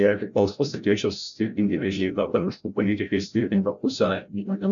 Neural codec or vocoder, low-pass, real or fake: codec, 16 kHz, 0.5 kbps, FreqCodec, larger model; 7.2 kHz; fake